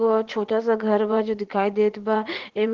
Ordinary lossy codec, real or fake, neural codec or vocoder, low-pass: Opus, 16 kbps; fake; vocoder, 44.1 kHz, 80 mel bands, Vocos; 7.2 kHz